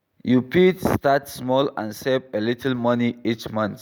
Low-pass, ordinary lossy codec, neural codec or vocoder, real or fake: none; none; none; real